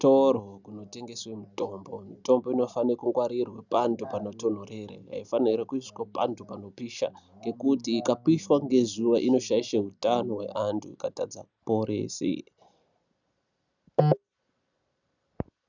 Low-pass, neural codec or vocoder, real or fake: 7.2 kHz; none; real